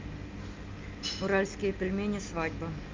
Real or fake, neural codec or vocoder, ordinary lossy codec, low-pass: real; none; Opus, 32 kbps; 7.2 kHz